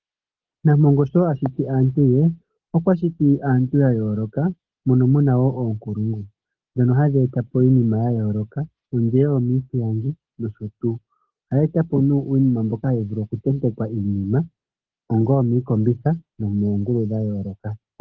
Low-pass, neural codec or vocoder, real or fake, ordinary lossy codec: 7.2 kHz; none; real; Opus, 16 kbps